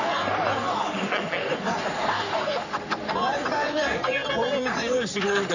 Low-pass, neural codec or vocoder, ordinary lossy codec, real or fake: 7.2 kHz; codec, 44.1 kHz, 3.4 kbps, Pupu-Codec; none; fake